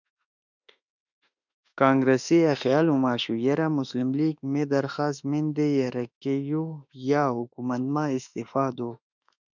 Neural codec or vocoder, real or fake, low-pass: autoencoder, 48 kHz, 32 numbers a frame, DAC-VAE, trained on Japanese speech; fake; 7.2 kHz